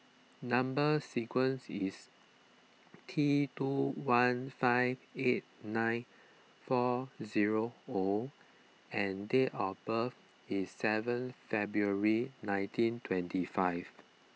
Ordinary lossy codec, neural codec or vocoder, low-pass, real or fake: none; none; none; real